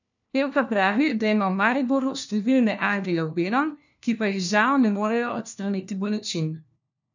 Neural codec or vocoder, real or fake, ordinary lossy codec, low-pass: codec, 16 kHz, 1 kbps, FunCodec, trained on LibriTTS, 50 frames a second; fake; none; 7.2 kHz